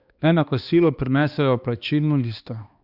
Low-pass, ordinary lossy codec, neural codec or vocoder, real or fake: 5.4 kHz; Opus, 64 kbps; codec, 16 kHz, 2 kbps, X-Codec, HuBERT features, trained on balanced general audio; fake